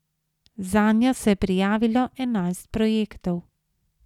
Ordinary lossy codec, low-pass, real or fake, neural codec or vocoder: none; 19.8 kHz; real; none